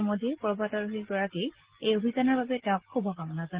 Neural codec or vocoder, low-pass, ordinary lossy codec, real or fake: none; 3.6 kHz; Opus, 16 kbps; real